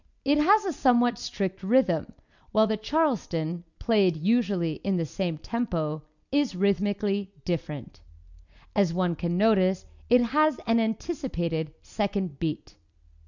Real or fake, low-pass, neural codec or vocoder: real; 7.2 kHz; none